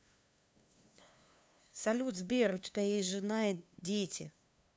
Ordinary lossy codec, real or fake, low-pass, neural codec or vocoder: none; fake; none; codec, 16 kHz, 2 kbps, FunCodec, trained on LibriTTS, 25 frames a second